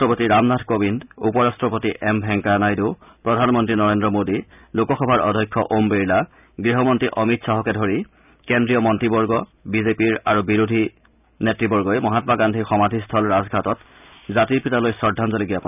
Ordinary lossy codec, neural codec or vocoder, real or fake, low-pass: none; none; real; 3.6 kHz